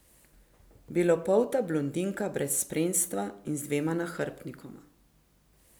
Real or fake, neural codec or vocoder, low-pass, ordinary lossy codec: real; none; none; none